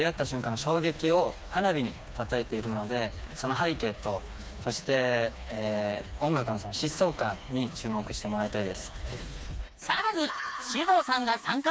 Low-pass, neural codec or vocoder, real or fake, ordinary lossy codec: none; codec, 16 kHz, 2 kbps, FreqCodec, smaller model; fake; none